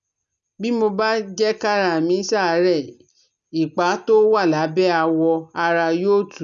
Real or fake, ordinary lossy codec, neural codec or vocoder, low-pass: real; none; none; 7.2 kHz